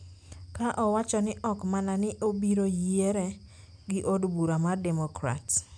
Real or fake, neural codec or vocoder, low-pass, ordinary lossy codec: real; none; 9.9 kHz; none